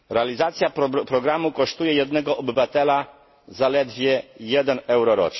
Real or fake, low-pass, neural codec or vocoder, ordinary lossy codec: real; 7.2 kHz; none; MP3, 24 kbps